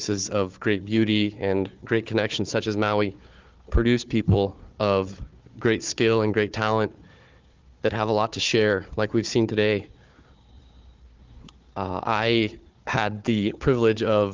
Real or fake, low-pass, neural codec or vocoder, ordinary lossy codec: fake; 7.2 kHz; codec, 16 kHz, 4 kbps, FunCodec, trained on Chinese and English, 50 frames a second; Opus, 24 kbps